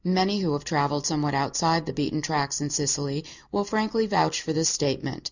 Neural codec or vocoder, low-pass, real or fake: none; 7.2 kHz; real